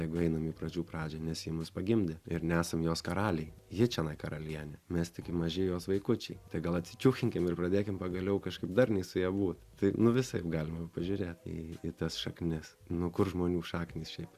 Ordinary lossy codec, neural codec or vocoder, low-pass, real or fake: Opus, 64 kbps; none; 14.4 kHz; real